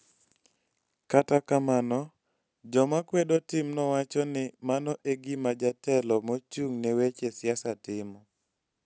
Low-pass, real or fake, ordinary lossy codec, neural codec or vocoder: none; real; none; none